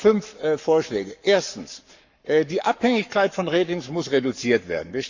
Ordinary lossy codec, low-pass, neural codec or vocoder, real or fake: Opus, 64 kbps; 7.2 kHz; codec, 44.1 kHz, 7.8 kbps, Pupu-Codec; fake